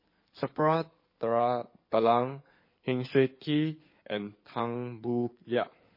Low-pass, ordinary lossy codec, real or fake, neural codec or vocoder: 5.4 kHz; MP3, 24 kbps; fake; codec, 16 kHz in and 24 kHz out, 2.2 kbps, FireRedTTS-2 codec